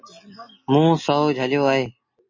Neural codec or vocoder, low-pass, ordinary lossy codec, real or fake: none; 7.2 kHz; MP3, 32 kbps; real